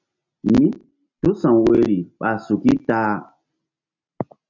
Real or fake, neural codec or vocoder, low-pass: real; none; 7.2 kHz